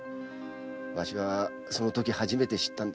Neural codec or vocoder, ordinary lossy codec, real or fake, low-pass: none; none; real; none